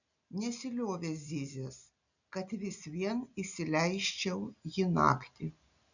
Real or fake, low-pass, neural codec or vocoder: real; 7.2 kHz; none